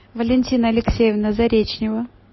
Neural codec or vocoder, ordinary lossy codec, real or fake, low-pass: none; MP3, 24 kbps; real; 7.2 kHz